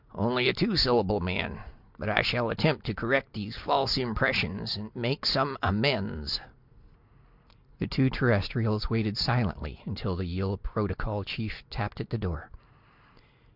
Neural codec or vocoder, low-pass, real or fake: none; 5.4 kHz; real